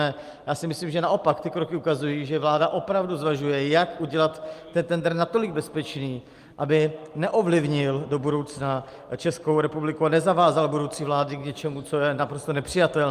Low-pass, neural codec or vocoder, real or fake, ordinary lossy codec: 14.4 kHz; none; real; Opus, 32 kbps